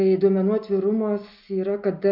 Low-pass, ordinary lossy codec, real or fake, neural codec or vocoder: 5.4 kHz; MP3, 48 kbps; real; none